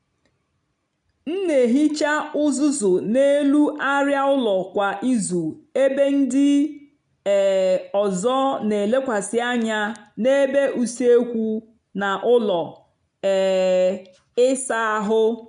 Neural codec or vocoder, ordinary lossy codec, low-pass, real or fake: none; none; 9.9 kHz; real